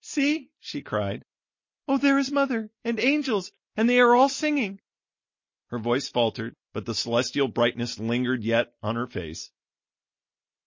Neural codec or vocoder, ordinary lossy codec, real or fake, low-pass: none; MP3, 32 kbps; real; 7.2 kHz